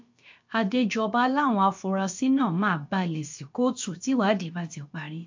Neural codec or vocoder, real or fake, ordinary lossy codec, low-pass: codec, 16 kHz, about 1 kbps, DyCAST, with the encoder's durations; fake; MP3, 48 kbps; 7.2 kHz